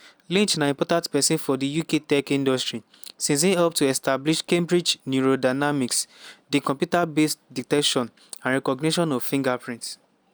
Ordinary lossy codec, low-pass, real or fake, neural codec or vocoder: none; none; real; none